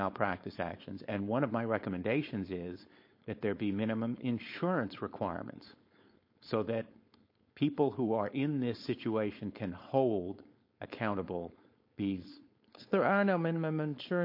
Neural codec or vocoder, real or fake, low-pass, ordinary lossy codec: codec, 16 kHz, 4.8 kbps, FACodec; fake; 5.4 kHz; MP3, 32 kbps